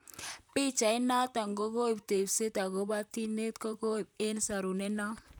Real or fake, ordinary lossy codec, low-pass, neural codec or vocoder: fake; none; none; vocoder, 44.1 kHz, 128 mel bands, Pupu-Vocoder